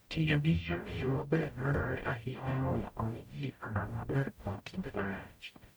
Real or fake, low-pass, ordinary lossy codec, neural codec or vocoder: fake; none; none; codec, 44.1 kHz, 0.9 kbps, DAC